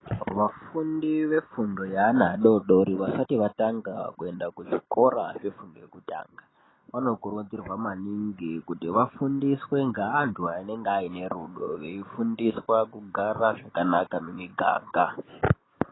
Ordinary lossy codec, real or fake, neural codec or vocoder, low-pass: AAC, 16 kbps; real; none; 7.2 kHz